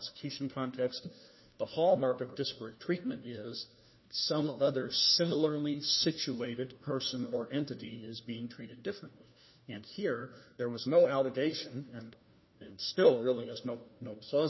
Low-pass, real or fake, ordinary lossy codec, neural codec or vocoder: 7.2 kHz; fake; MP3, 24 kbps; codec, 16 kHz, 1 kbps, FunCodec, trained on LibriTTS, 50 frames a second